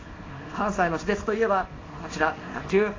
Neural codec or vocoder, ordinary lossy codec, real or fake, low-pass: codec, 24 kHz, 0.9 kbps, WavTokenizer, small release; AAC, 32 kbps; fake; 7.2 kHz